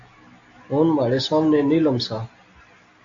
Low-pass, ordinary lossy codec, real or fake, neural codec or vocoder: 7.2 kHz; AAC, 64 kbps; real; none